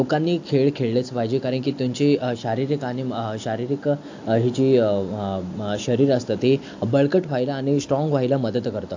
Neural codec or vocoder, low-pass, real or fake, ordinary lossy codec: none; 7.2 kHz; real; AAC, 48 kbps